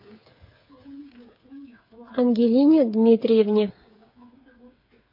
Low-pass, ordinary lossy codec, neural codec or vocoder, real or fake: 5.4 kHz; MP3, 32 kbps; codec, 16 kHz, 8 kbps, FreqCodec, smaller model; fake